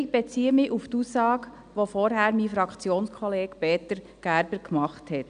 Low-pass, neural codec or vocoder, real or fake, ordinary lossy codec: 9.9 kHz; none; real; none